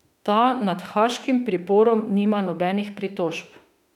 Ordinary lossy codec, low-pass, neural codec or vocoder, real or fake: none; 19.8 kHz; autoencoder, 48 kHz, 32 numbers a frame, DAC-VAE, trained on Japanese speech; fake